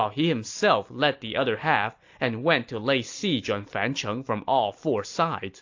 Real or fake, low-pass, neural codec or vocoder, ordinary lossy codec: real; 7.2 kHz; none; AAC, 48 kbps